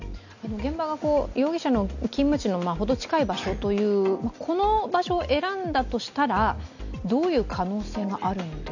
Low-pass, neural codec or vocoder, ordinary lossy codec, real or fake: 7.2 kHz; none; none; real